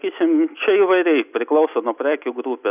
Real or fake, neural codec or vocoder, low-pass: real; none; 3.6 kHz